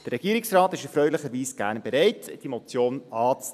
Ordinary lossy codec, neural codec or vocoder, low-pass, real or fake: none; none; 14.4 kHz; real